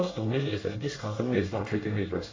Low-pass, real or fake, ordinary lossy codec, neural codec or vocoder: 7.2 kHz; fake; AAC, 32 kbps; codec, 24 kHz, 1 kbps, SNAC